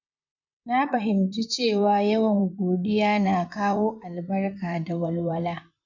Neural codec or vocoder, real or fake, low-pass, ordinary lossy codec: vocoder, 44.1 kHz, 128 mel bands, Pupu-Vocoder; fake; 7.2 kHz; none